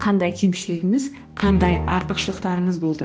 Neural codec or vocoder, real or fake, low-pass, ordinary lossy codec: codec, 16 kHz, 1 kbps, X-Codec, HuBERT features, trained on balanced general audio; fake; none; none